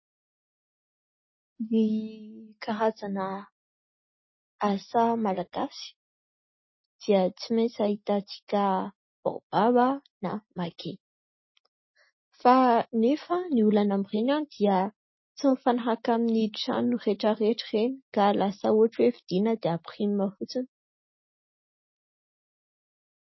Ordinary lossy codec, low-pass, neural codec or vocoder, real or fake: MP3, 24 kbps; 7.2 kHz; none; real